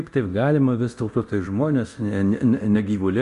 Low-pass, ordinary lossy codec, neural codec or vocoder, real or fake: 10.8 kHz; Opus, 64 kbps; codec, 24 kHz, 0.9 kbps, DualCodec; fake